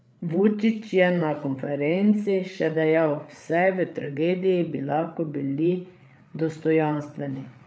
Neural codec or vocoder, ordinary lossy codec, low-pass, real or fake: codec, 16 kHz, 8 kbps, FreqCodec, larger model; none; none; fake